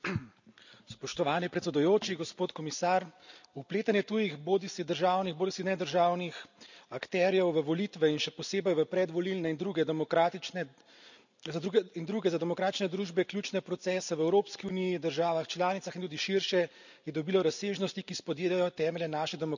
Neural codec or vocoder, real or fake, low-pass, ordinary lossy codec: none; real; 7.2 kHz; none